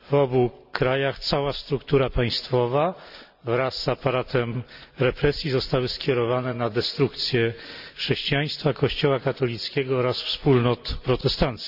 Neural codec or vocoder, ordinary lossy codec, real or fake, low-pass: none; none; real; 5.4 kHz